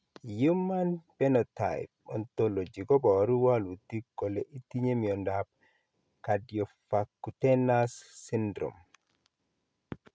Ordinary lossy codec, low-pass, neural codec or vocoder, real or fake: none; none; none; real